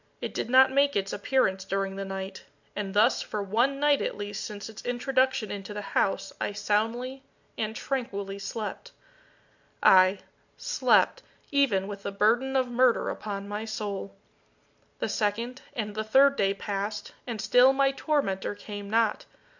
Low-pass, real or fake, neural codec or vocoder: 7.2 kHz; real; none